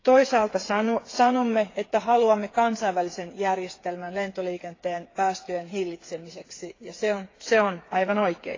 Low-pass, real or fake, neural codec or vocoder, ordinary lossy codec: 7.2 kHz; fake; codec, 16 kHz, 8 kbps, FreqCodec, smaller model; AAC, 32 kbps